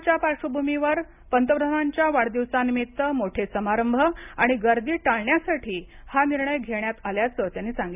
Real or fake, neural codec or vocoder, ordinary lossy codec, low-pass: real; none; none; 3.6 kHz